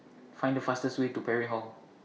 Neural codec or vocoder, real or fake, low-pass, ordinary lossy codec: none; real; none; none